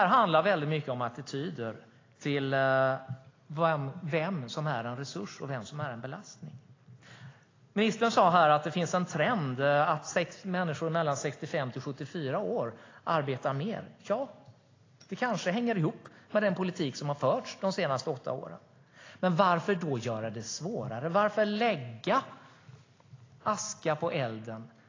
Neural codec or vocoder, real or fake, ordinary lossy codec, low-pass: none; real; AAC, 32 kbps; 7.2 kHz